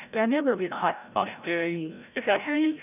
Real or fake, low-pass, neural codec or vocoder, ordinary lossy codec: fake; 3.6 kHz; codec, 16 kHz, 0.5 kbps, FreqCodec, larger model; none